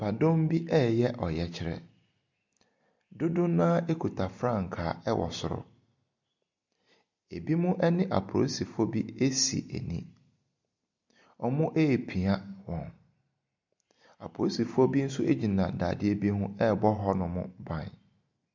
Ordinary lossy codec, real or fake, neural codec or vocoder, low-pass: MP3, 64 kbps; real; none; 7.2 kHz